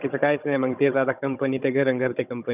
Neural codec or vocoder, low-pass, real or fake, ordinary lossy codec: codec, 16 kHz, 16 kbps, FunCodec, trained on Chinese and English, 50 frames a second; 3.6 kHz; fake; none